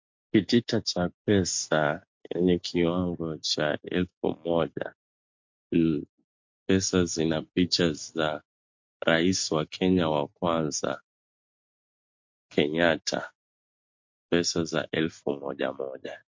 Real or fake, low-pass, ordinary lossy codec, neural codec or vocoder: real; 7.2 kHz; MP3, 48 kbps; none